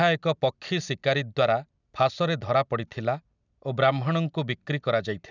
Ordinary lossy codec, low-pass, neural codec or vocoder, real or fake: none; 7.2 kHz; none; real